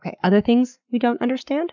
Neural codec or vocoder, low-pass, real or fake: codec, 44.1 kHz, 7.8 kbps, Pupu-Codec; 7.2 kHz; fake